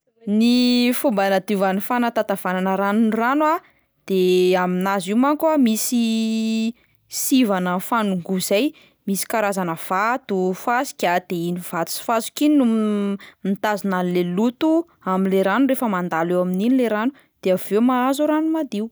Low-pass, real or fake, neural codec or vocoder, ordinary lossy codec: none; real; none; none